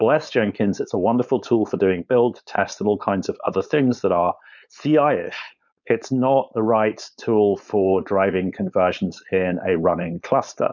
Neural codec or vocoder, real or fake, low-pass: codec, 16 kHz, 4.8 kbps, FACodec; fake; 7.2 kHz